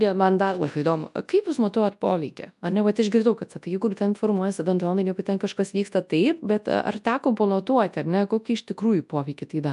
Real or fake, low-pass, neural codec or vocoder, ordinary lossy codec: fake; 10.8 kHz; codec, 24 kHz, 0.9 kbps, WavTokenizer, large speech release; AAC, 96 kbps